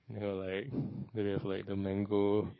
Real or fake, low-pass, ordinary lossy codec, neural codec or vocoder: fake; 7.2 kHz; MP3, 24 kbps; codec, 44.1 kHz, 7.8 kbps, DAC